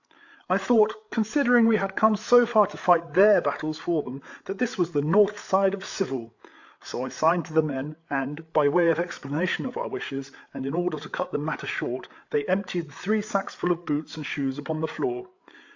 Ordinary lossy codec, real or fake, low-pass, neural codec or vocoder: AAC, 48 kbps; fake; 7.2 kHz; codec, 16 kHz, 8 kbps, FreqCodec, larger model